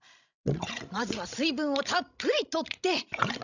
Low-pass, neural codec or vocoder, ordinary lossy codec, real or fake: 7.2 kHz; codec, 16 kHz, 16 kbps, FreqCodec, larger model; none; fake